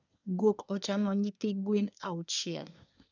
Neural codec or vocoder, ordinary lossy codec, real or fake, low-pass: codec, 24 kHz, 1 kbps, SNAC; none; fake; 7.2 kHz